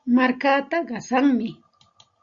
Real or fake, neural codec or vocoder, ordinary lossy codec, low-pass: real; none; Opus, 64 kbps; 7.2 kHz